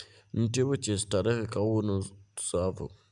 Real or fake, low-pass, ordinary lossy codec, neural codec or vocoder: real; 10.8 kHz; none; none